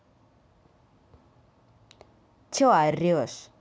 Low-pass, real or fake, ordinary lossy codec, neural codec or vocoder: none; real; none; none